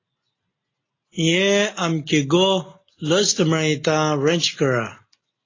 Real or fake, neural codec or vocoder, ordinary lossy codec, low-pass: real; none; AAC, 32 kbps; 7.2 kHz